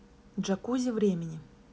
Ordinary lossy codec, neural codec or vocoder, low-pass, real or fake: none; none; none; real